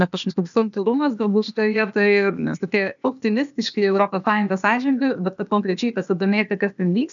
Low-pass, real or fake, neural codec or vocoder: 7.2 kHz; fake; codec, 16 kHz, 0.8 kbps, ZipCodec